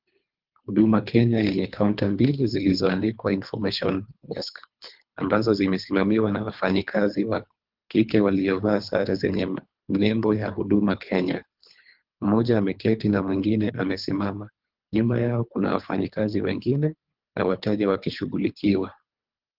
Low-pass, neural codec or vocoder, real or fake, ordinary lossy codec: 5.4 kHz; codec, 24 kHz, 3 kbps, HILCodec; fake; Opus, 32 kbps